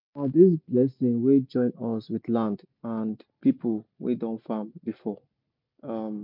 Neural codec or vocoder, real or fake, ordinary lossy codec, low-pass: none; real; MP3, 48 kbps; 5.4 kHz